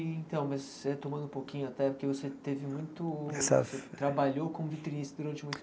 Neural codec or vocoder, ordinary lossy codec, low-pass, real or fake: none; none; none; real